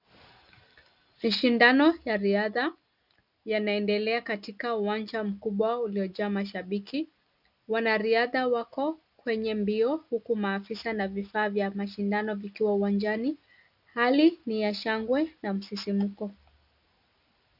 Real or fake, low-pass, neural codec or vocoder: real; 5.4 kHz; none